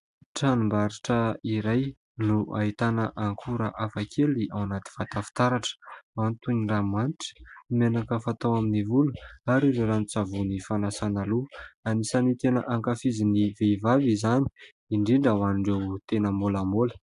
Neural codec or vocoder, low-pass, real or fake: none; 10.8 kHz; real